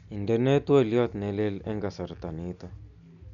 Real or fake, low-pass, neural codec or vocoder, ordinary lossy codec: real; 7.2 kHz; none; none